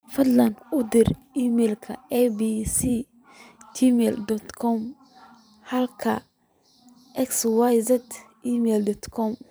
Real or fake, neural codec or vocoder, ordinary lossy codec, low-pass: fake; vocoder, 44.1 kHz, 128 mel bands every 512 samples, BigVGAN v2; none; none